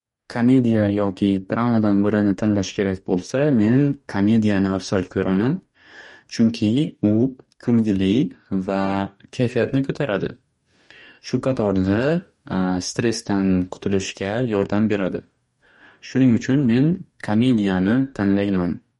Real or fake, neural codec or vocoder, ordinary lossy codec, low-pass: fake; codec, 44.1 kHz, 2.6 kbps, DAC; MP3, 48 kbps; 19.8 kHz